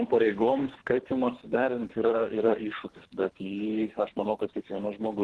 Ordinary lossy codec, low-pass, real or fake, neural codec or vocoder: Opus, 16 kbps; 10.8 kHz; fake; codec, 24 kHz, 3 kbps, HILCodec